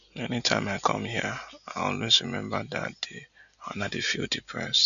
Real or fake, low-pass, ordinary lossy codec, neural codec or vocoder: real; 7.2 kHz; none; none